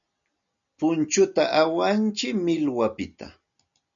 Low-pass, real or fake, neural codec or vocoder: 7.2 kHz; real; none